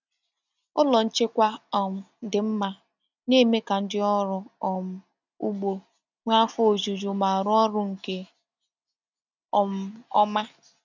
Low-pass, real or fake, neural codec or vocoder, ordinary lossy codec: 7.2 kHz; real; none; none